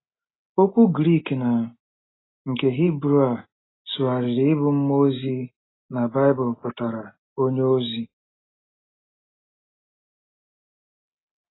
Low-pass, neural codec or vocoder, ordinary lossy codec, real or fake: 7.2 kHz; none; AAC, 16 kbps; real